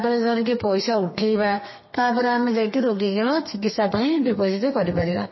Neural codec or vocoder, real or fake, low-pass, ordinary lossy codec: codec, 32 kHz, 1.9 kbps, SNAC; fake; 7.2 kHz; MP3, 24 kbps